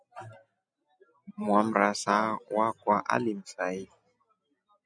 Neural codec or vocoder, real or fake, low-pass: none; real; 9.9 kHz